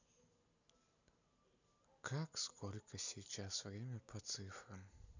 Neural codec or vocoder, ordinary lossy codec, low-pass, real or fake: none; none; 7.2 kHz; real